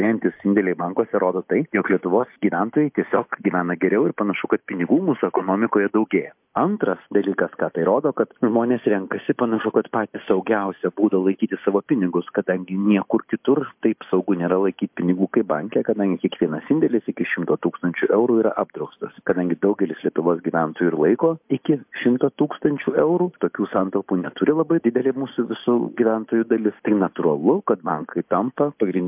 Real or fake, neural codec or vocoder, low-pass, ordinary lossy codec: real; none; 3.6 kHz; MP3, 32 kbps